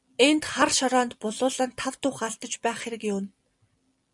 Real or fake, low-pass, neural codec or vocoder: real; 10.8 kHz; none